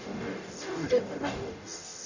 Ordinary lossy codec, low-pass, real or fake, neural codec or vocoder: none; 7.2 kHz; fake; codec, 44.1 kHz, 0.9 kbps, DAC